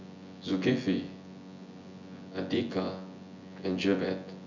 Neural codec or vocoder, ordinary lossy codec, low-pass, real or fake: vocoder, 24 kHz, 100 mel bands, Vocos; none; 7.2 kHz; fake